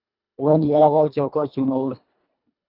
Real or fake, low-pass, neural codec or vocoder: fake; 5.4 kHz; codec, 24 kHz, 1.5 kbps, HILCodec